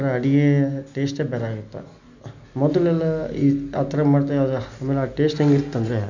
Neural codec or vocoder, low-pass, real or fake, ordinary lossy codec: none; 7.2 kHz; real; none